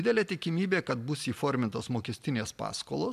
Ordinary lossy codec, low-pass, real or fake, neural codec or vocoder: MP3, 96 kbps; 14.4 kHz; real; none